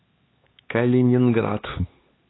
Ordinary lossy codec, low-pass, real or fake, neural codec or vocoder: AAC, 16 kbps; 7.2 kHz; fake; codec, 16 kHz, 4 kbps, X-Codec, HuBERT features, trained on LibriSpeech